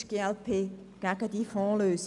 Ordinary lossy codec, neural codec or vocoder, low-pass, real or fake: none; none; 10.8 kHz; real